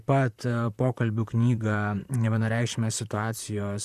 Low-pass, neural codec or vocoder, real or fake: 14.4 kHz; vocoder, 44.1 kHz, 128 mel bands, Pupu-Vocoder; fake